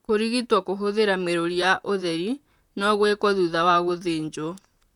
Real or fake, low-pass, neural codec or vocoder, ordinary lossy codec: fake; 19.8 kHz; vocoder, 44.1 kHz, 128 mel bands, Pupu-Vocoder; none